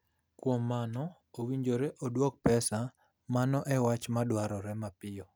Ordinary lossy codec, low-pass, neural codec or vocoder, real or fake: none; none; none; real